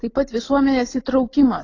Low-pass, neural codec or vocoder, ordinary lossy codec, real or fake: 7.2 kHz; none; AAC, 32 kbps; real